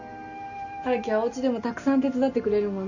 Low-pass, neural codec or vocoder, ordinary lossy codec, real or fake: 7.2 kHz; none; none; real